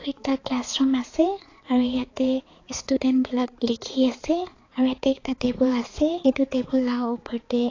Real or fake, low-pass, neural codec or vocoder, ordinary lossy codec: fake; 7.2 kHz; codec, 16 kHz, 4 kbps, X-Codec, HuBERT features, trained on balanced general audio; AAC, 32 kbps